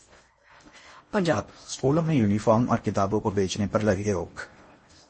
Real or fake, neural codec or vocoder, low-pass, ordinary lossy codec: fake; codec, 16 kHz in and 24 kHz out, 0.6 kbps, FocalCodec, streaming, 4096 codes; 10.8 kHz; MP3, 32 kbps